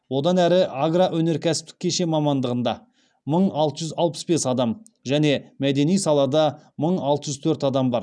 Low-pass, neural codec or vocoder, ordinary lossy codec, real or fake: 9.9 kHz; none; none; real